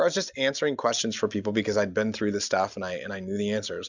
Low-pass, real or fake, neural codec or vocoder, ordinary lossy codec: 7.2 kHz; real; none; Opus, 64 kbps